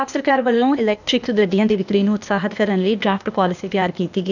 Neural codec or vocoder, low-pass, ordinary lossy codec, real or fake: codec, 16 kHz, 0.8 kbps, ZipCodec; 7.2 kHz; none; fake